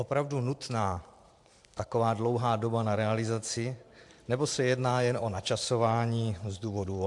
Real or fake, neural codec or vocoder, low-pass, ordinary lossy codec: fake; vocoder, 44.1 kHz, 128 mel bands every 512 samples, BigVGAN v2; 10.8 kHz; AAC, 64 kbps